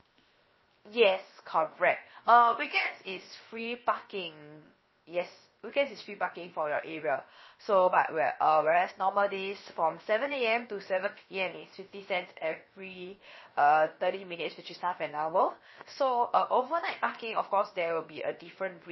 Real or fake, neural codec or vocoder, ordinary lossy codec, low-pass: fake; codec, 16 kHz, 0.7 kbps, FocalCodec; MP3, 24 kbps; 7.2 kHz